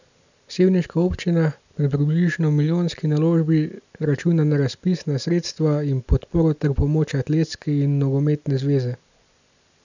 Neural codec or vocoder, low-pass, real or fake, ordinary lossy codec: none; 7.2 kHz; real; none